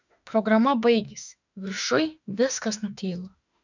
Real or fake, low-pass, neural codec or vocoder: fake; 7.2 kHz; autoencoder, 48 kHz, 32 numbers a frame, DAC-VAE, trained on Japanese speech